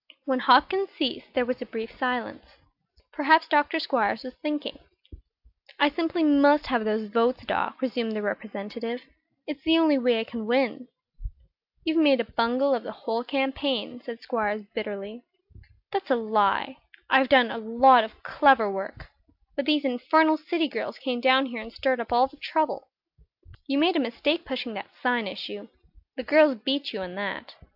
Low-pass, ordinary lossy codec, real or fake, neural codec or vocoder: 5.4 kHz; AAC, 48 kbps; real; none